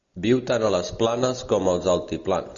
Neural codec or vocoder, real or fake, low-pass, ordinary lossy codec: none; real; 7.2 kHz; Opus, 64 kbps